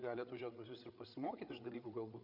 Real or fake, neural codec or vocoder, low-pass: fake; codec, 16 kHz, 8 kbps, FreqCodec, larger model; 5.4 kHz